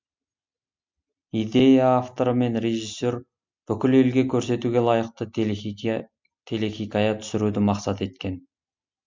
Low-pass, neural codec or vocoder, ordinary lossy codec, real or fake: 7.2 kHz; none; MP3, 64 kbps; real